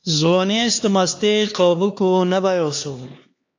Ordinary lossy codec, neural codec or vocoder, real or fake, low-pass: AAC, 48 kbps; codec, 16 kHz, 2 kbps, X-Codec, HuBERT features, trained on LibriSpeech; fake; 7.2 kHz